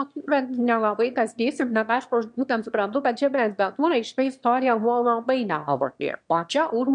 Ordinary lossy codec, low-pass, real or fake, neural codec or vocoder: MP3, 64 kbps; 9.9 kHz; fake; autoencoder, 22.05 kHz, a latent of 192 numbers a frame, VITS, trained on one speaker